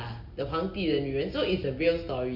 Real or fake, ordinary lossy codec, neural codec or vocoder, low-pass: real; none; none; 5.4 kHz